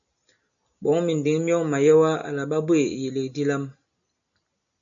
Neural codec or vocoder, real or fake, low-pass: none; real; 7.2 kHz